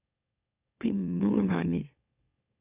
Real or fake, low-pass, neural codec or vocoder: fake; 3.6 kHz; autoencoder, 44.1 kHz, a latent of 192 numbers a frame, MeloTTS